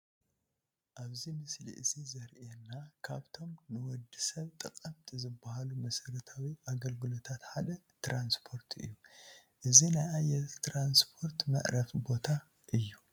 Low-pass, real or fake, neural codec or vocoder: 19.8 kHz; real; none